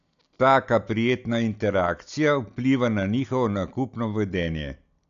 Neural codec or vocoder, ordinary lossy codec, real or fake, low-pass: none; none; real; 7.2 kHz